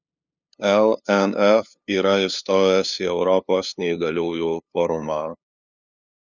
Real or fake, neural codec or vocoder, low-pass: fake; codec, 16 kHz, 2 kbps, FunCodec, trained on LibriTTS, 25 frames a second; 7.2 kHz